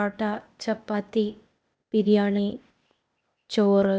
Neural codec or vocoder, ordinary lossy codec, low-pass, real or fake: codec, 16 kHz, 0.8 kbps, ZipCodec; none; none; fake